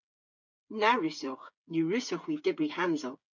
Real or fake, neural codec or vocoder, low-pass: fake; codec, 16 kHz, 8 kbps, FreqCodec, smaller model; 7.2 kHz